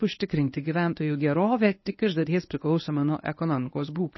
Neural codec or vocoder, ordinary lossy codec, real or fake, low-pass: codec, 24 kHz, 0.9 kbps, WavTokenizer, medium speech release version 1; MP3, 24 kbps; fake; 7.2 kHz